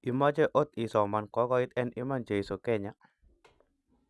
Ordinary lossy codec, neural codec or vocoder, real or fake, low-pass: none; none; real; none